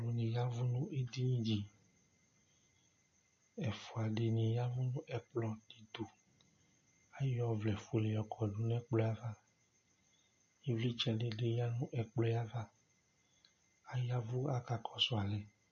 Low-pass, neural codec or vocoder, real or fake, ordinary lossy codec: 7.2 kHz; none; real; MP3, 32 kbps